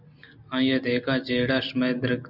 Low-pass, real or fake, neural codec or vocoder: 5.4 kHz; real; none